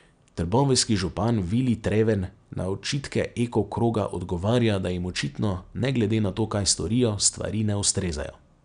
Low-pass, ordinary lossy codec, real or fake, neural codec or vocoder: 9.9 kHz; none; real; none